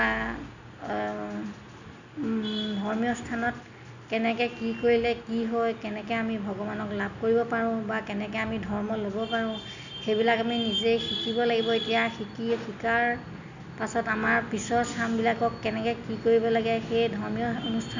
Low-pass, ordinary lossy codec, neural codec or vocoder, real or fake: 7.2 kHz; none; none; real